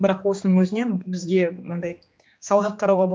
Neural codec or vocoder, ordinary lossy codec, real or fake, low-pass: codec, 16 kHz, 2 kbps, X-Codec, HuBERT features, trained on general audio; none; fake; none